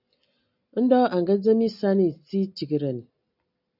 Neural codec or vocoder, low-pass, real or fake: none; 5.4 kHz; real